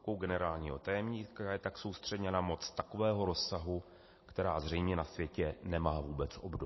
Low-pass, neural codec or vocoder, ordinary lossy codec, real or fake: 7.2 kHz; none; MP3, 24 kbps; real